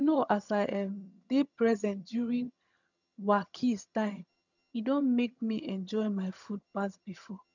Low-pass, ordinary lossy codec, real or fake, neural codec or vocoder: 7.2 kHz; none; fake; vocoder, 22.05 kHz, 80 mel bands, HiFi-GAN